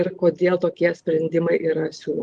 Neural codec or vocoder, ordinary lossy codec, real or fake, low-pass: none; Opus, 24 kbps; real; 10.8 kHz